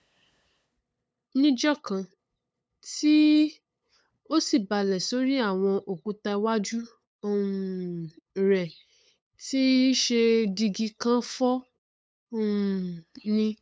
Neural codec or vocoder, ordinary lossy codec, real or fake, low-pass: codec, 16 kHz, 8 kbps, FunCodec, trained on LibriTTS, 25 frames a second; none; fake; none